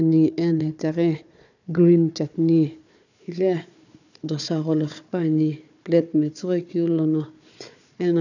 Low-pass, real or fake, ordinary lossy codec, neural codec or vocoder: 7.2 kHz; fake; none; codec, 16 kHz, 4 kbps, FunCodec, trained on Chinese and English, 50 frames a second